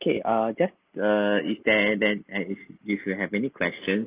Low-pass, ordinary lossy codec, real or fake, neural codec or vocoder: 3.6 kHz; Opus, 32 kbps; real; none